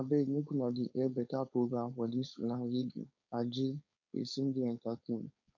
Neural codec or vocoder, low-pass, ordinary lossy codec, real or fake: codec, 16 kHz, 4.8 kbps, FACodec; 7.2 kHz; MP3, 64 kbps; fake